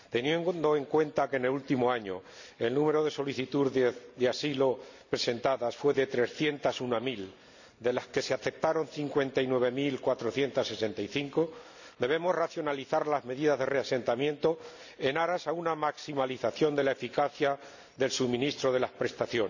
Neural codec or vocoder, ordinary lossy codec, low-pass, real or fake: none; none; 7.2 kHz; real